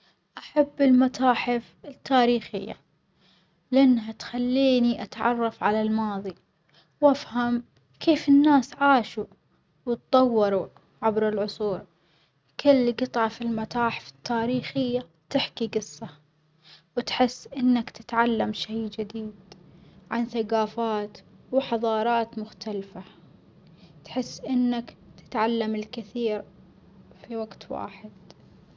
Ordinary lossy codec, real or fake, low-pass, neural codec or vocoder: none; real; none; none